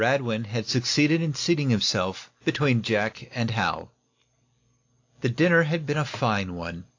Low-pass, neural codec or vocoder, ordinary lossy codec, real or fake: 7.2 kHz; none; AAC, 48 kbps; real